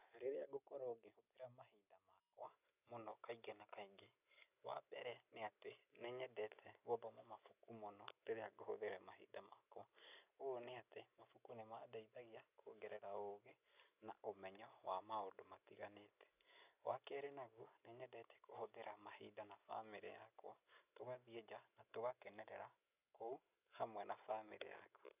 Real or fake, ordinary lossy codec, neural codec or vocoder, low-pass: real; none; none; 3.6 kHz